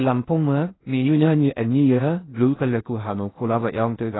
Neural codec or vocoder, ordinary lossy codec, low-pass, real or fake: codec, 16 kHz in and 24 kHz out, 0.6 kbps, FocalCodec, streaming, 4096 codes; AAC, 16 kbps; 7.2 kHz; fake